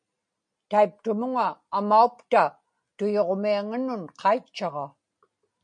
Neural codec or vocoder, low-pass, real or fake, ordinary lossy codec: none; 9.9 kHz; real; MP3, 48 kbps